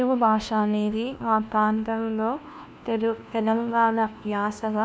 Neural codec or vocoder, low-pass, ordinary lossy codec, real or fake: codec, 16 kHz, 1 kbps, FunCodec, trained on LibriTTS, 50 frames a second; none; none; fake